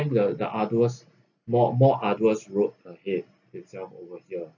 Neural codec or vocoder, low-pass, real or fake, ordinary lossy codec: none; 7.2 kHz; real; none